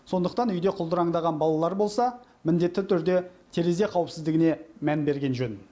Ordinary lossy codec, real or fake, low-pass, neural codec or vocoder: none; real; none; none